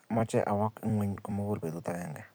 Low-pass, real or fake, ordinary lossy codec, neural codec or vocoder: none; fake; none; vocoder, 44.1 kHz, 128 mel bands every 256 samples, BigVGAN v2